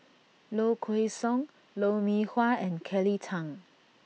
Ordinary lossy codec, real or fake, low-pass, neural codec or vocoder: none; real; none; none